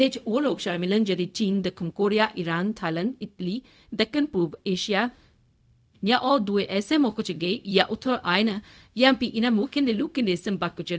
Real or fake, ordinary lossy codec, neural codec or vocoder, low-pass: fake; none; codec, 16 kHz, 0.4 kbps, LongCat-Audio-Codec; none